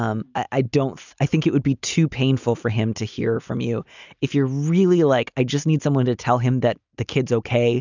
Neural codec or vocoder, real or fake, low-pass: none; real; 7.2 kHz